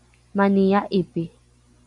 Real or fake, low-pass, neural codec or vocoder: real; 10.8 kHz; none